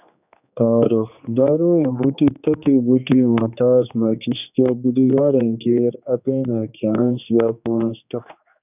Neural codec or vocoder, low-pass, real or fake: codec, 16 kHz, 2 kbps, X-Codec, HuBERT features, trained on general audio; 3.6 kHz; fake